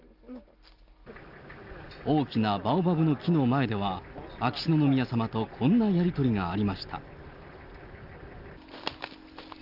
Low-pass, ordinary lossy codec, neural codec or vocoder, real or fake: 5.4 kHz; Opus, 32 kbps; none; real